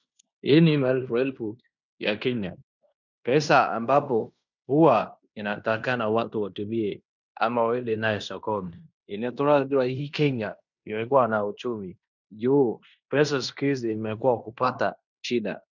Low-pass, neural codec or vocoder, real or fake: 7.2 kHz; codec, 16 kHz in and 24 kHz out, 0.9 kbps, LongCat-Audio-Codec, fine tuned four codebook decoder; fake